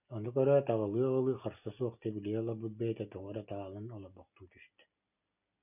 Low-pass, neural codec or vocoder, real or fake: 3.6 kHz; none; real